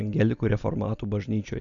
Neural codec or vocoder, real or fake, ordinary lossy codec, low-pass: none; real; Opus, 64 kbps; 7.2 kHz